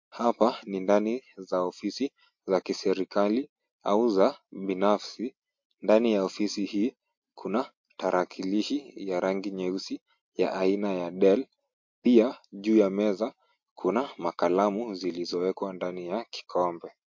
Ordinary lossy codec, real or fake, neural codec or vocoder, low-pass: MP3, 48 kbps; real; none; 7.2 kHz